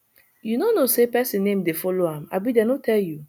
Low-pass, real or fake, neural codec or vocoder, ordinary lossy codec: 19.8 kHz; real; none; none